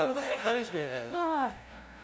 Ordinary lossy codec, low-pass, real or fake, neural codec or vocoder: none; none; fake; codec, 16 kHz, 0.5 kbps, FunCodec, trained on LibriTTS, 25 frames a second